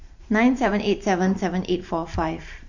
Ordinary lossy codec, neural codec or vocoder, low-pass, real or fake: none; vocoder, 44.1 kHz, 128 mel bands every 512 samples, BigVGAN v2; 7.2 kHz; fake